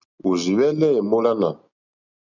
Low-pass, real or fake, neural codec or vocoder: 7.2 kHz; real; none